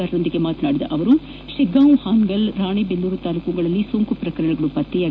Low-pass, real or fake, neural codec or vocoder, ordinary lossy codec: none; real; none; none